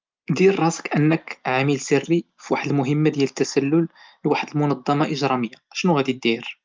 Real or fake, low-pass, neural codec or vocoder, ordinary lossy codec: real; 7.2 kHz; none; Opus, 24 kbps